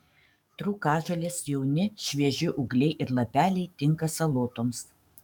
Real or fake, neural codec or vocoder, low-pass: fake; codec, 44.1 kHz, 7.8 kbps, DAC; 19.8 kHz